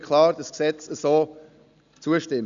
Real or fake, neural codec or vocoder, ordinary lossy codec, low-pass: real; none; Opus, 64 kbps; 7.2 kHz